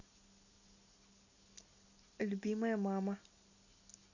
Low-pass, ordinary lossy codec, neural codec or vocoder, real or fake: 7.2 kHz; none; none; real